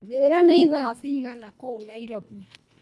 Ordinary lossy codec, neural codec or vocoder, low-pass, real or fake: none; codec, 24 kHz, 1.5 kbps, HILCodec; none; fake